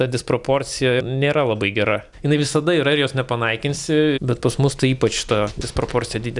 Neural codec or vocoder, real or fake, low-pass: none; real; 10.8 kHz